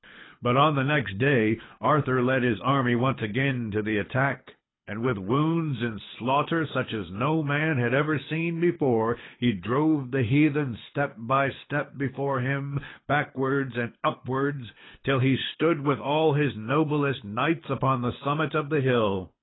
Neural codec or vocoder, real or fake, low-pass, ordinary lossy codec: codec, 24 kHz, 6 kbps, HILCodec; fake; 7.2 kHz; AAC, 16 kbps